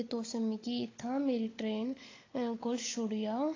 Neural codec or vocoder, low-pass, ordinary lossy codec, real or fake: codec, 16 kHz, 16 kbps, FunCodec, trained on LibriTTS, 50 frames a second; 7.2 kHz; AAC, 32 kbps; fake